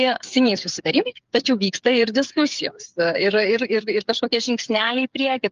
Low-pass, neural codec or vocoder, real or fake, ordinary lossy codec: 7.2 kHz; codec, 16 kHz, 8 kbps, FreqCodec, smaller model; fake; Opus, 32 kbps